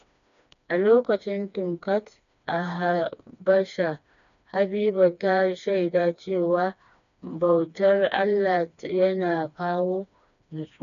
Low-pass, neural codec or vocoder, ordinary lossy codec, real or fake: 7.2 kHz; codec, 16 kHz, 2 kbps, FreqCodec, smaller model; none; fake